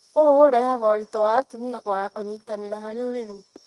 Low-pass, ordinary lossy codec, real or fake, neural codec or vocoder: 10.8 kHz; Opus, 24 kbps; fake; codec, 24 kHz, 0.9 kbps, WavTokenizer, medium music audio release